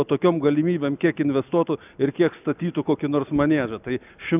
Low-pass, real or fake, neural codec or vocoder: 3.6 kHz; real; none